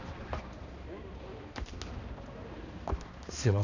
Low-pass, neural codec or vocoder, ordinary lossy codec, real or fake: 7.2 kHz; codec, 16 kHz, 2 kbps, X-Codec, HuBERT features, trained on balanced general audio; none; fake